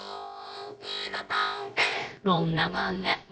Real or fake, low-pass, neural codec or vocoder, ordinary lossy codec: fake; none; codec, 16 kHz, about 1 kbps, DyCAST, with the encoder's durations; none